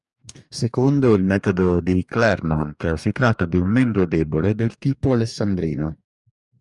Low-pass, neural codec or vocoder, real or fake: 10.8 kHz; codec, 44.1 kHz, 2.6 kbps, DAC; fake